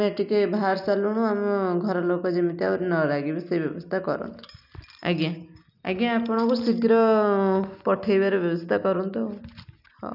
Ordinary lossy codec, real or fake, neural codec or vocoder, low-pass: none; real; none; 5.4 kHz